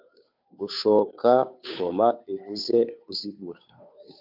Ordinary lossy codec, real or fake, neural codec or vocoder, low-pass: AAC, 48 kbps; fake; codec, 16 kHz, 2 kbps, FunCodec, trained on Chinese and English, 25 frames a second; 5.4 kHz